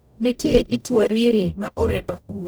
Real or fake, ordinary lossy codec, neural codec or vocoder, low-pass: fake; none; codec, 44.1 kHz, 0.9 kbps, DAC; none